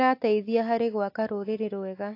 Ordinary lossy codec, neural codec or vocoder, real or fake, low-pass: AAC, 32 kbps; none; real; 5.4 kHz